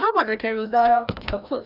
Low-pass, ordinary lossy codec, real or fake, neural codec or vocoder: 5.4 kHz; none; fake; codec, 16 kHz, 1 kbps, FreqCodec, larger model